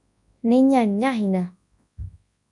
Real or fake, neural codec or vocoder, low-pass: fake; codec, 24 kHz, 0.9 kbps, WavTokenizer, large speech release; 10.8 kHz